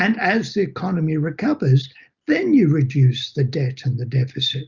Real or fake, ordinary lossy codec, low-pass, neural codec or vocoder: real; Opus, 64 kbps; 7.2 kHz; none